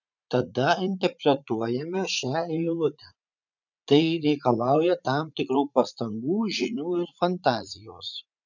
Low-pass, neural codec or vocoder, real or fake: 7.2 kHz; vocoder, 44.1 kHz, 80 mel bands, Vocos; fake